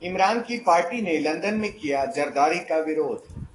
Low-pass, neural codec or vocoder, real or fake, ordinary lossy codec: 10.8 kHz; autoencoder, 48 kHz, 128 numbers a frame, DAC-VAE, trained on Japanese speech; fake; AAC, 32 kbps